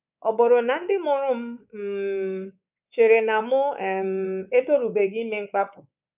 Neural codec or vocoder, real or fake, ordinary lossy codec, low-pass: codec, 24 kHz, 3.1 kbps, DualCodec; fake; none; 3.6 kHz